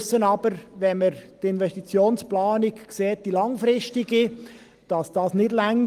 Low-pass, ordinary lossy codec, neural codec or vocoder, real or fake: 14.4 kHz; Opus, 24 kbps; none; real